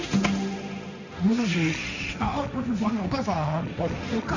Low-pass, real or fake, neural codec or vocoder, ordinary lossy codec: 7.2 kHz; fake; codec, 16 kHz, 1.1 kbps, Voila-Tokenizer; none